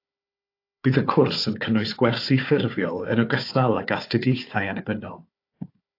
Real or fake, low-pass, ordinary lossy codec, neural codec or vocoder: fake; 5.4 kHz; AAC, 32 kbps; codec, 16 kHz, 4 kbps, FunCodec, trained on Chinese and English, 50 frames a second